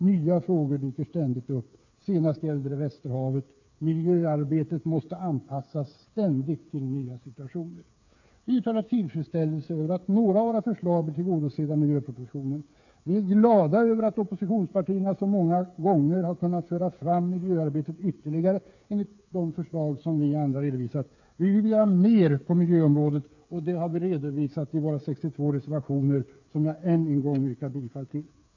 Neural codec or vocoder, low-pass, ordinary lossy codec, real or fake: codec, 16 kHz, 8 kbps, FreqCodec, smaller model; 7.2 kHz; AAC, 48 kbps; fake